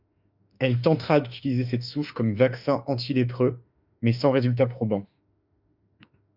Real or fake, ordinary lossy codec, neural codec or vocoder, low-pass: fake; Opus, 64 kbps; autoencoder, 48 kHz, 32 numbers a frame, DAC-VAE, trained on Japanese speech; 5.4 kHz